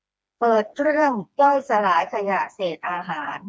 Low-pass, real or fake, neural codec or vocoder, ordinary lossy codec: none; fake; codec, 16 kHz, 2 kbps, FreqCodec, smaller model; none